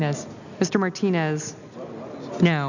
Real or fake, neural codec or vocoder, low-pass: real; none; 7.2 kHz